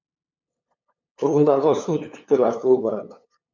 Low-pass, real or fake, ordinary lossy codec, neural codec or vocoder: 7.2 kHz; fake; MP3, 48 kbps; codec, 16 kHz, 2 kbps, FunCodec, trained on LibriTTS, 25 frames a second